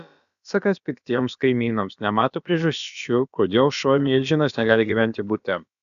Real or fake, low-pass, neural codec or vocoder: fake; 7.2 kHz; codec, 16 kHz, about 1 kbps, DyCAST, with the encoder's durations